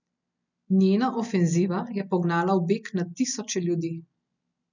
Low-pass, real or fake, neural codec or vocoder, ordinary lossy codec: 7.2 kHz; real; none; none